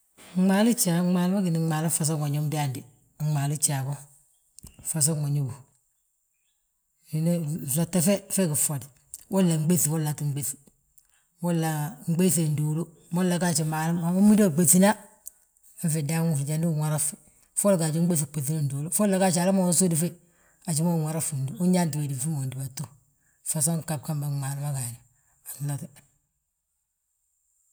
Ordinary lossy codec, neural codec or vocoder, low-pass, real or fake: none; none; none; real